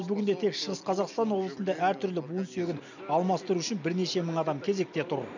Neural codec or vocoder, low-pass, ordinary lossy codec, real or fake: none; 7.2 kHz; none; real